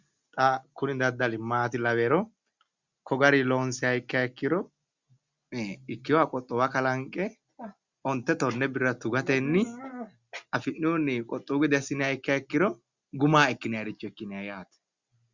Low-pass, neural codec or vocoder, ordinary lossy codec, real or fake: 7.2 kHz; none; Opus, 64 kbps; real